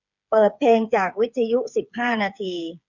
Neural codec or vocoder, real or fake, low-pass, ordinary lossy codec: codec, 16 kHz, 8 kbps, FreqCodec, smaller model; fake; 7.2 kHz; none